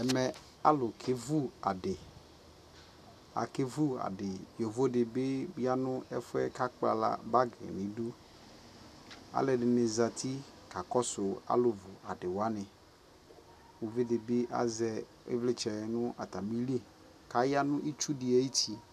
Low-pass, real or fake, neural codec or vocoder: 14.4 kHz; real; none